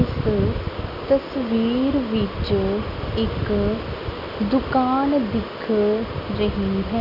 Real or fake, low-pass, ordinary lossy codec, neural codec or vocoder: real; 5.4 kHz; none; none